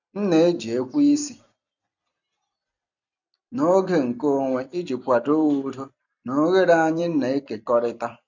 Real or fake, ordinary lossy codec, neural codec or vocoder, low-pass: fake; none; vocoder, 44.1 kHz, 128 mel bands every 256 samples, BigVGAN v2; 7.2 kHz